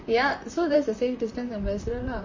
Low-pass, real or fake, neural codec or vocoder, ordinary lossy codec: 7.2 kHz; fake; vocoder, 44.1 kHz, 128 mel bands, Pupu-Vocoder; MP3, 32 kbps